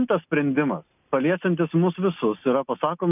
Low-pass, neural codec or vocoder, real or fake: 3.6 kHz; none; real